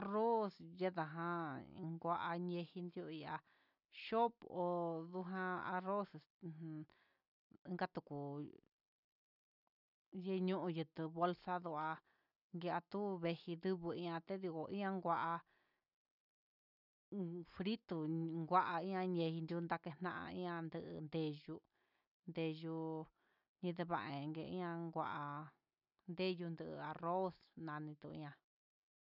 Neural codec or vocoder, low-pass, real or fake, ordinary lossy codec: none; 5.4 kHz; real; none